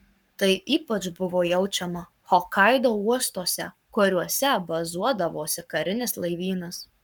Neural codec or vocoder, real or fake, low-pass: codec, 44.1 kHz, 7.8 kbps, Pupu-Codec; fake; 19.8 kHz